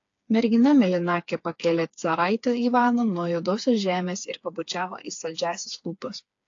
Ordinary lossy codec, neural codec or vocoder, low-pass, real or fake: AAC, 48 kbps; codec, 16 kHz, 4 kbps, FreqCodec, smaller model; 7.2 kHz; fake